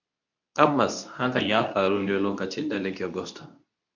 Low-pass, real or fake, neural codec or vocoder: 7.2 kHz; fake; codec, 24 kHz, 0.9 kbps, WavTokenizer, medium speech release version 2